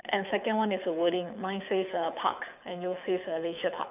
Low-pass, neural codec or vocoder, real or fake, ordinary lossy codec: 3.6 kHz; codec, 24 kHz, 6 kbps, HILCodec; fake; none